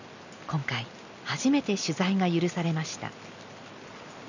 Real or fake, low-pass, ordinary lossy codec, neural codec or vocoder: real; 7.2 kHz; none; none